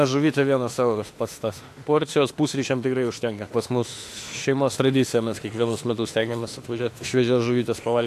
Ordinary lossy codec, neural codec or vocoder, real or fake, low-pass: AAC, 64 kbps; autoencoder, 48 kHz, 32 numbers a frame, DAC-VAE, trained on Japanese speech; fake; 14.4 kHz